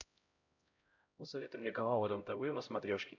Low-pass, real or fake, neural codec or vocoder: 7.2 kHz; fake; codec, 16 kHz, 0.5 kbps, X-Codec, HuBERT features, trained on LibriSpeech